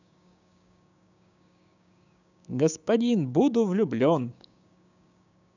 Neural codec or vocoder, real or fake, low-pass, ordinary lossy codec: codec, 16 kHz, 6 kbps, DAC; fake; 7.2 kHz; none